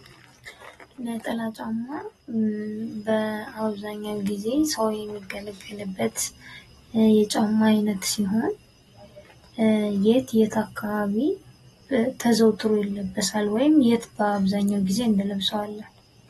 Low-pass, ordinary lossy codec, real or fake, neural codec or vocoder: 14.4 kHz; AAC, 32 kbps; real; none